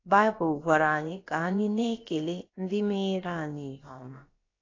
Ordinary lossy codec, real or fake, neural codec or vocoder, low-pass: AAC, 32 kbps; fake; codec, 16 kHz, about 1 kbps, DyCAST, with the encoder's durations; 7.2 kHz